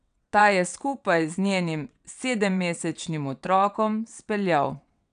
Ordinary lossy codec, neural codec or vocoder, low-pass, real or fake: none; vocoder, 22.05 kHz, 80 mel bands, WaveNeXt; 9.9 kHz; fake